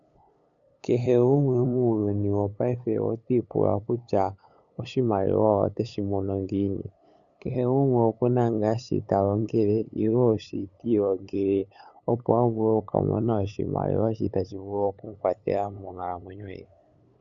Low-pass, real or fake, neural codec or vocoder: 7.2 kHz; fake; codec, 16 kHz, 8 kbps, FunCodec, trained on LibriTTS, 25 frames a second